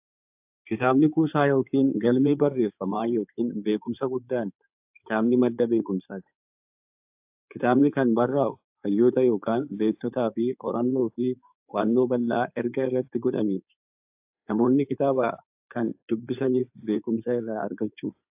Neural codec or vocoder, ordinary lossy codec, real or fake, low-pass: codec, 16 kHz in and 24 kHz out, 2.2 kbps, FireRedTTS-2 codec; AAC, 32 kbps; fake; 3.6 kHz